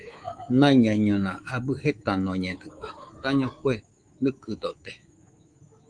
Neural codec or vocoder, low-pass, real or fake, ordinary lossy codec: codec, 24 kHz, 3.1 kbps, DualCodec; 9.9 kHz; fake; Opus, 24 kbps